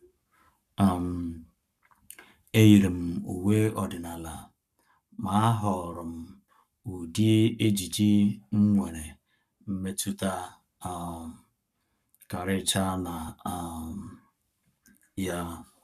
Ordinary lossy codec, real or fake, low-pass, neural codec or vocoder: none; fake; 14.4 kHz; codec, 44.1 kHz, 7.8 kbps, Pupu-Codec